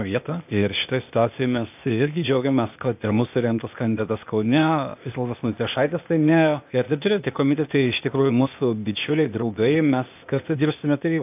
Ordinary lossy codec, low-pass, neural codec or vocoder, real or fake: AAC, 32 kbps; 3.6 kHz; codec, 16 kHz, 0.8 kbps, ZipCodec; fake